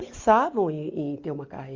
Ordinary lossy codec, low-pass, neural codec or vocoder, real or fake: Opus, 24 kbps; 7.2 kHz; none; real